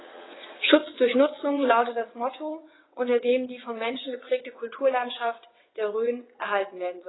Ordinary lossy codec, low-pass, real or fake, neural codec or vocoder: AAC, 16 kbps; 7.2 kHz; fake; vocoder, 22.05 kHz, 80 mel bands, WaveNeXt